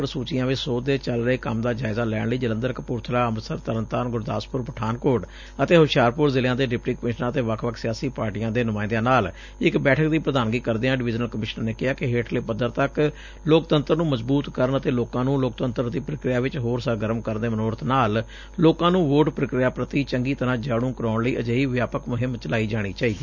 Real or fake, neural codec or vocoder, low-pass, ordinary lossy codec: real; none; 7.2 kHz; none